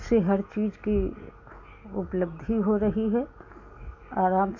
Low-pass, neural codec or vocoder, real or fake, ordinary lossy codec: 7.2 kHz; none; real; none